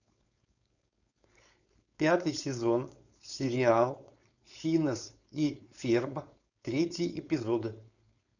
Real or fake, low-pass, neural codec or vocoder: fake; 7.2 kHz; codec, 16 kHz, 4.8 kbps, FACodec